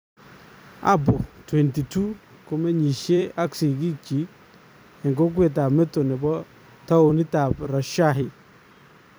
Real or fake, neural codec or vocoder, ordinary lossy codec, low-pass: fake; vocoder, 44.1 kHz, 128 mel bands every 256 samples, BigVGAN v2; none; none